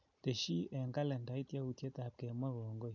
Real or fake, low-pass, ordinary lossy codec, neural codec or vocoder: real; 7.2 kHz; none; none